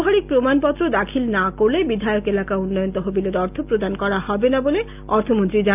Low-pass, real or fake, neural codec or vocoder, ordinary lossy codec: 3.6 kHz; real; none; none